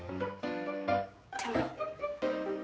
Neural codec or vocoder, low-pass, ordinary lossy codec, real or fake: codec, 16 kHz, 4 kbps, X-Codec, HuBERT features, trained on general audio; none; none; fake